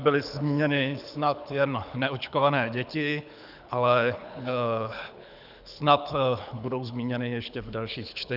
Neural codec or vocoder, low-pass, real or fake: codec, 24 kHz, 6 kbps, HILCodec; 5.4 kHz; fake